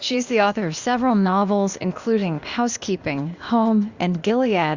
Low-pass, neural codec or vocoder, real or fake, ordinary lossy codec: 7.2 kHz; codec, 16 kHz, 0.8 kbps, ZipCodec; fake; Opus, 64 kbps